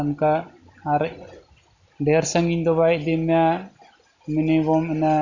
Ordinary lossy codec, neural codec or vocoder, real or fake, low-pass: Opus, 64 kbps; none; real; 7.2 kHz